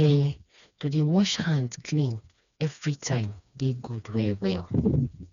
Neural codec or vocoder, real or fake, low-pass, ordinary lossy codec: codec, 16 kHz, 2 kbps, FreqCodec, smaller model; fake; 7.2 kHz; none